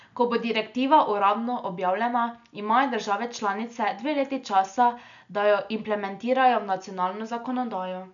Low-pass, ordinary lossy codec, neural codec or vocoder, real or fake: 7.2 kHz; none; none; real